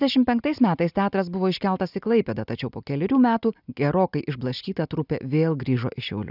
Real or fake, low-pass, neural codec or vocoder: real; 5.4 kHz; none